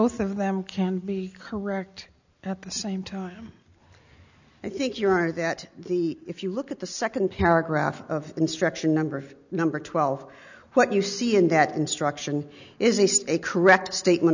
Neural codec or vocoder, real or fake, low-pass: none; real; 7.2 kHz